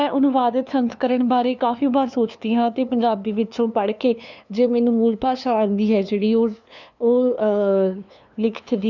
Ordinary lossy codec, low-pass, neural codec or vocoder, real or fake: none; 7.2 kHz; codec, 16 kHz, 2 kbps, FunCodec, trained on LibriTTS, 25 frames a second; fake